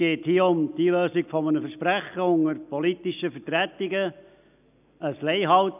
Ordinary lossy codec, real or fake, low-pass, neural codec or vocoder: none; real; 3.6 kHz; none